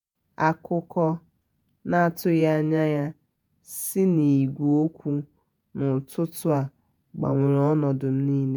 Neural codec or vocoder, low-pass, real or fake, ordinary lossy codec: vocoder, 48 kHz, 128 mel bands, Vocos; none; fake; none